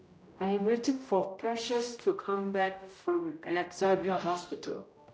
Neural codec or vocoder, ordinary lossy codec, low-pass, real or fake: codec, 16 kHz, 0.5 kbps, X-Codec, HuBERT features, trained on general audio; none; none; fake